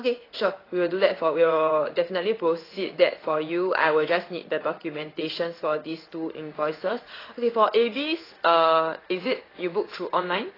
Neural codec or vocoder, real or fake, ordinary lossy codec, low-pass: codec, 16 kHz in and 24 kHz out, 1 kbps, XY-Tokenizer; fake; AAC, 24 kbps; 5.4 kHz